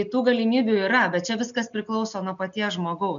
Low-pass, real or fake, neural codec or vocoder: 7.2 kHz; real; none